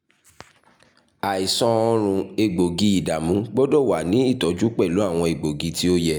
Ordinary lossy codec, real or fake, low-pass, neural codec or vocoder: none; real; none; none